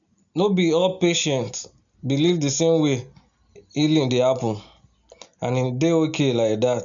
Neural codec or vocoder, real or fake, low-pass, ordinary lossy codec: none; real; 7.2 kHz; none